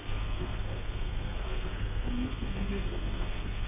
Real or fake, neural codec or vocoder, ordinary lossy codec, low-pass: fake; codec, 32 kHz, 1.9 kbps, SNAC; MP3, 24 kbps; 3.6 kHz